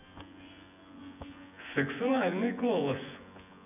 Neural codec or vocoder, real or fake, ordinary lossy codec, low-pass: vocoder, 24 kHz, 100 mel bands, Vocos; fake; none; 3.6 kHz